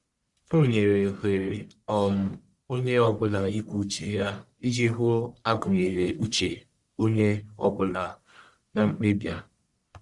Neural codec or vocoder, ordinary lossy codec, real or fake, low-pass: codec, 44.1 kHz, 1.7 kbps, Pupu-Codec; Opus, 64 kbps; fake; 10.8 kHz